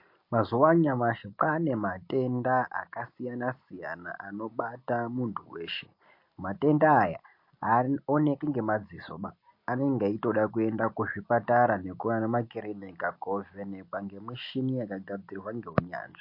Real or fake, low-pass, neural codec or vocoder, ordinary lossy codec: real; 5.4 kHz; none; MP3, 32 kbps